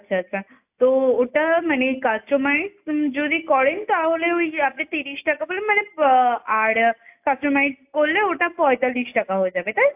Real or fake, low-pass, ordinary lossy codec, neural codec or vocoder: real; 3.6 kHz; AAC, 32 kbps; none